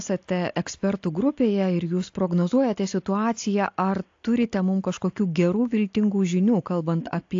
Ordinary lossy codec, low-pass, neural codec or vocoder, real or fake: AAC, 48 kbps; 7.2 kHz; none; real